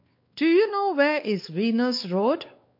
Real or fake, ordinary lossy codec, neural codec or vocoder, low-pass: fake; MP3, 32 kbps; codec, 16 kHz, 4 kbps, X-Codec, WavLM features, trained on Multilingual LibriSpeech; 5.4 kHz